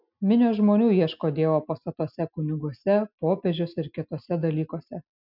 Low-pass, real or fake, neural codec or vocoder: 5.4 kHz; real; none